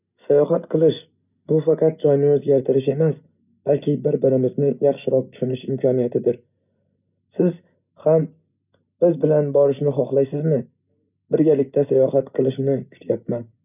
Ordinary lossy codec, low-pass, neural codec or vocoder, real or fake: none; 3.6 kHz; none; real